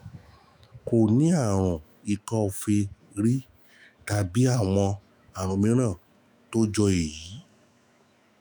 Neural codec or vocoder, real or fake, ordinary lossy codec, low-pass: autoencoder, 48 kHz, 128 numbers a frame, DAC-VAE, trained on Japanese speech; fake; none; none